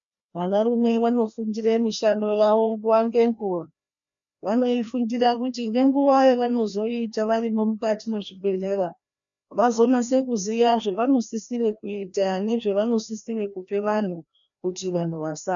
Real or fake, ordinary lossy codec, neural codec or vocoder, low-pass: fake; MP3, 96 kbps; codec, 16 kHz, 1 kbps, FreqCodec, larger model; 7.2 kHz